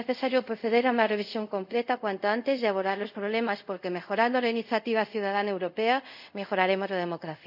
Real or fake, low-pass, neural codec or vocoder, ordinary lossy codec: fake; 5.4 kHz; codec, 24 kHz, 0.5 kbps, DualCodec; none